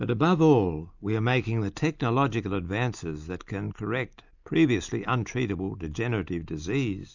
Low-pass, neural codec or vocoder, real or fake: 7.2 kHz; none; real